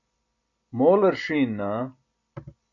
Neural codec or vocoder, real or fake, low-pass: none; real; 7.2 kHz